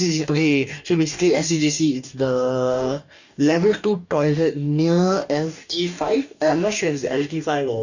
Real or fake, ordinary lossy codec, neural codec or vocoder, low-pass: fake; none; codec, 44.1 kHz, 2.6 kbps, DAC; 7.2 kHz